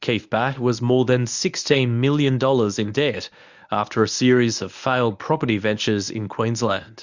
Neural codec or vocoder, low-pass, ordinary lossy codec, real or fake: codec, 24 kHz, 0.9 kbps, WavTokenizer, medium speech release version 1; 7.2 kHz; Opus, 64 kbps; fake